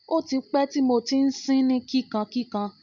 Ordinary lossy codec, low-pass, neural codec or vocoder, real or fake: none; 7.2 kHz; none; real